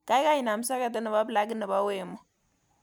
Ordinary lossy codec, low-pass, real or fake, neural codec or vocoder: none; none; real; none